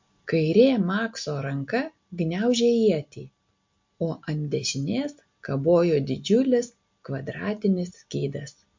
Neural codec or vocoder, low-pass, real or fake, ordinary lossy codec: none; 7.2 kHz; real; MP3, 48 kbps